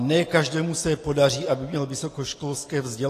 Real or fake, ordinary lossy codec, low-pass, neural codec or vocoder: real; AAC, 48 kbps; 14.4 kHz; none